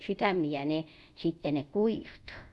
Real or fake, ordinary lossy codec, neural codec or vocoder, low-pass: fake; none; codec, 24 kHz, 0.5 kbps, DualCodec; none